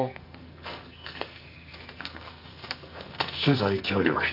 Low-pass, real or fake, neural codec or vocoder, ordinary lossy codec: 5.4 kHz; fake; codec, 44.1 kHz, 2.6 kbps, SNAC; none